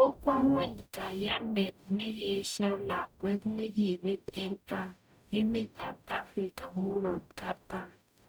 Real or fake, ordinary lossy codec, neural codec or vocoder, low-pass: fake; none; codec, 44.1 kHz, 0.9 kbps, DAC; none